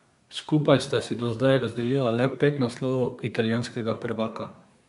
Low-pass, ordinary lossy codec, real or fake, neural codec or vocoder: 10.8 kHz; none; fake; codec, 24 kHz, 1 kbps, SNAC